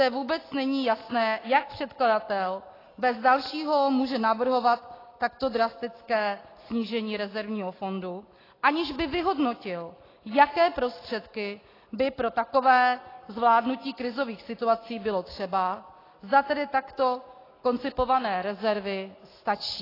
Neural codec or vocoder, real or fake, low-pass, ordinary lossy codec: none; real; 5.4 kHz; AAC, 24 kbps